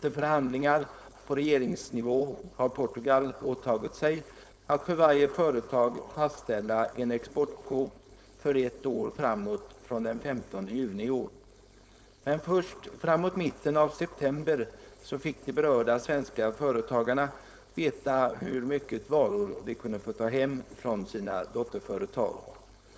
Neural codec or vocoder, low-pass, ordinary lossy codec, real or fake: codec, 16 kHz, 4.8 kbps, FACodec; none; none; fake